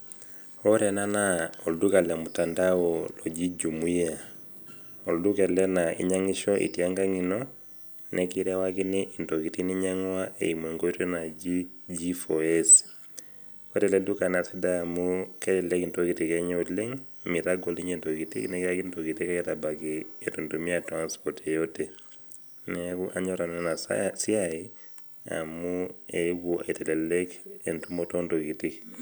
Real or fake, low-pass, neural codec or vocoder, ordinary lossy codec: real; none; none; none